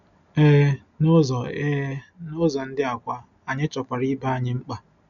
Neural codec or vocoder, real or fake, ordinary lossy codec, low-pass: none; real; none; 7.2 kHz